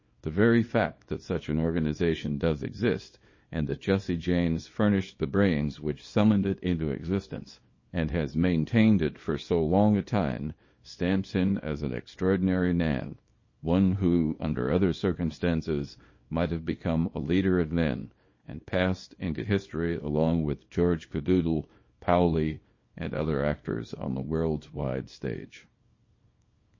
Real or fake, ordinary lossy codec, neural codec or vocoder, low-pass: fake; MP3, 32 kbps; codec, 24 kHz, 0.9 kbps, WavTokenizer, small release; 7.2 kHz